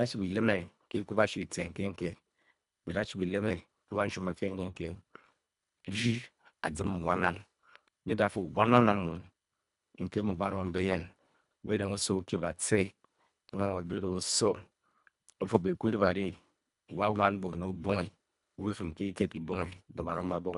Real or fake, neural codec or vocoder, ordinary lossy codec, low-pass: fake; codec, 24 kHz, 1.5 kbps, HILCodec; none; 10.8 kHz